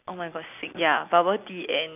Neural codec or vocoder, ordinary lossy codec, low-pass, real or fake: none; none; 3.6 kHz; real